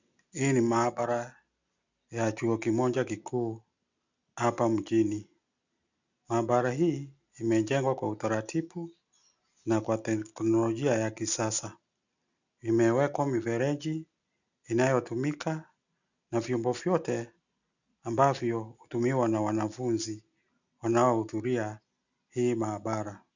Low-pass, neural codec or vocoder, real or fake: 7.2 kHz; none; real